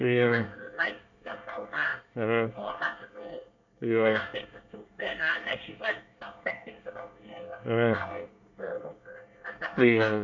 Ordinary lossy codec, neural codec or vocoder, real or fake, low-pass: none; codec, 24 kHz, 1 kbps, SNAC; fake; 7.2 kHz